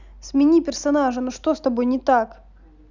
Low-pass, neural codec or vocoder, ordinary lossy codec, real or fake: 7.2 kHz; none; none; real